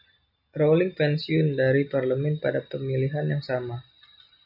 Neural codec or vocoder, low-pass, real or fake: none; 5.4 kHz; real